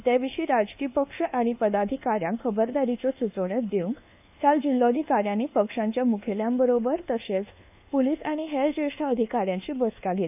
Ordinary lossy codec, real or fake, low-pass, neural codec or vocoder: none; fake; 3.6 kHz; codec, 24 kHz, 1.2 kbps, DualCodec